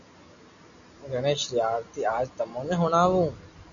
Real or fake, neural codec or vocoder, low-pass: real; none; 7.2 kHz